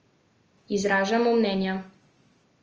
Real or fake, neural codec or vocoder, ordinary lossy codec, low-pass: real; none; Opus, 24 kbps; 7.2 kHz